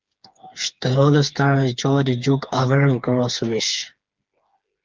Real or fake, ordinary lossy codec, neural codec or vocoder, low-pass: fake; Opus, 32 kbps; codec, 16 kHz, 4 kbps, FreqCodec, smaller model; 7.2 kHz